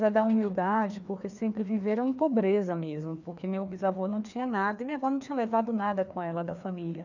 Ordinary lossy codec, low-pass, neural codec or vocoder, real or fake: none; 7.2 kHz; codec, 16 kHz, 2 kbps, FreqCodec, larger model; fake